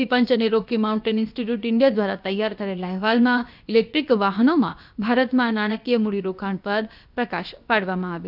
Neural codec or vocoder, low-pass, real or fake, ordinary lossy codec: codec, 16 kHz, about 1 kbps, DyCAST, with the encoder's durations; 5.4 kHz; fake; none